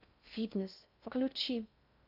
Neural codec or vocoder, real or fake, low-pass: codec, 16 kHz in and 24 kHz out, 0.6 kbps, FocalCodec, streaming, 2048 codes; fake; 5.4 kHz